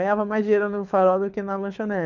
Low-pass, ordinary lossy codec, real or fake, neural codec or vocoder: 7.2 kHz; none; fake; codec, 24 kHz, 6 kbps, HILCodec